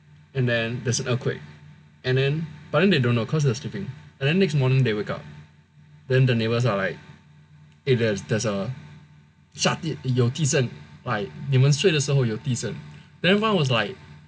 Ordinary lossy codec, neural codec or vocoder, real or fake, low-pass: none; none; real; none